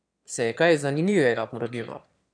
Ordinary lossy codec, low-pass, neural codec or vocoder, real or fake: none; 9.9 kHz; autoencoder, 22.05 kHz, a latent of 192 numbers a frame, VITS, trained on one speaker; fake